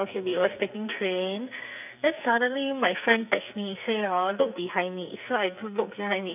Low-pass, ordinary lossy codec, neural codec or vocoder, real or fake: 3.6 kHz; none; codec, 44.1 kHz, 2.6 kbps, SNAC; fake